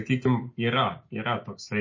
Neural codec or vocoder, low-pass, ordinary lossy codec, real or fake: none; 7.2 kHz; MP3, 32 kbps; real